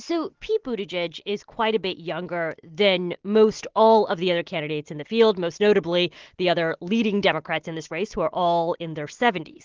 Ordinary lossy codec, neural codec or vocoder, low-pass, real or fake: Opus, 16 kbps; none; 7.2 kHz; real